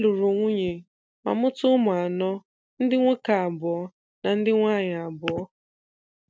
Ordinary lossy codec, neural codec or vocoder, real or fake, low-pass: none; none; real; none